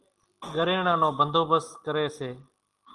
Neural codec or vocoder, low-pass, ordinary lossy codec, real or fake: none; 10.8 kHz; Opus, 24 kbps; real